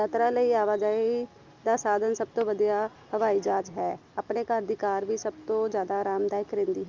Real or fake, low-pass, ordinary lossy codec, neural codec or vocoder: real; 7.2 kHz; Opus, 24 kbps; none